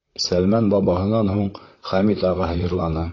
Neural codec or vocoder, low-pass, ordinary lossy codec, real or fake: vocoder, 44.1 kHz, 128 mel bands, Pupu-Vocoder; 7.2 kHz; AAC, 32 kbps; fake